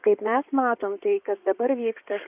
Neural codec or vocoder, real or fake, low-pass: codec, 16 kHz in and 24 kHz out, 2.2 kbps, FireRedTTS-2 codec; fake; 3.6 kHz